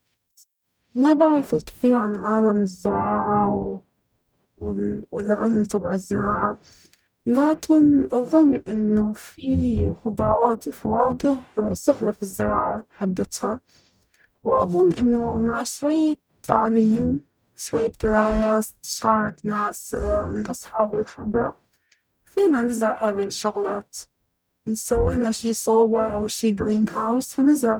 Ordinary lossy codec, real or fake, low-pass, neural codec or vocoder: none; fake; none; codec, 44.1 kHz, 0.9 kbps, DAC